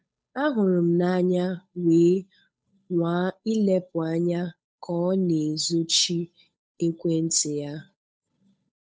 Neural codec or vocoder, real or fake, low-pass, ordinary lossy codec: codec, 16 kHz, 8 kbps, FunCodec, trained on Chinese and English, 25 frames a second; fake; none; none